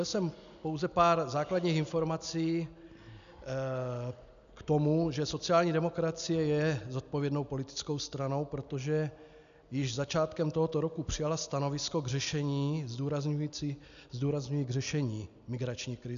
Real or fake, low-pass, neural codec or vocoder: real; 7.2 kHz; none